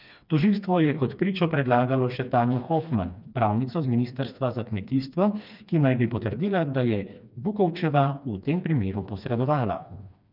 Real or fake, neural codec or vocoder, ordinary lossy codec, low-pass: fake; codec, 16 kHz, 2 kbps, FreqCodec, smaller model; none; 5.4 kHz